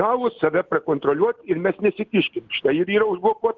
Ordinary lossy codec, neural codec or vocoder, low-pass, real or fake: Opus, 16 kbps; none; 7.2 kHz; real